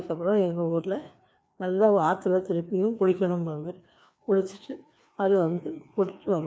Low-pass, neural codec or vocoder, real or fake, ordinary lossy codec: none; codec, 16 kHz, 2 kbps, FreqCodec, larger model; fake; none